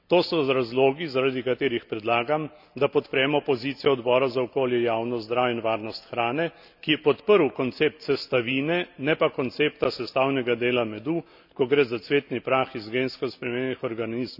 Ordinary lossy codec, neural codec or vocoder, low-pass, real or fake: none; none; 5.4 kHz; real